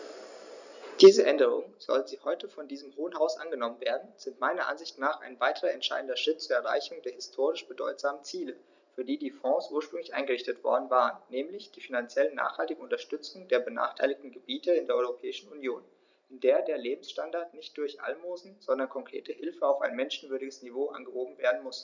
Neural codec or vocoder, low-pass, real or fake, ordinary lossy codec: none; 7.2 kHz; real; none